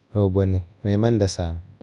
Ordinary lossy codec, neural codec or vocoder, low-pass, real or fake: none; codec, 24 kHz, 0.9 kbps, WavTokenizer, large speech release; 10.8 kHz; fake